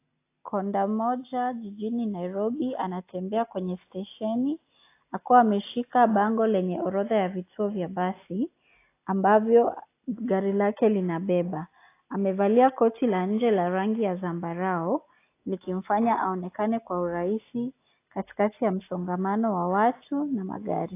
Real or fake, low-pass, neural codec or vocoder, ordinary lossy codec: real; 3.6 kHz; none; AAC, 24 kbps